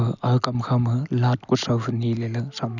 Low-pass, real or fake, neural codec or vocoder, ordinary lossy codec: 7.2 kHz; real; none; none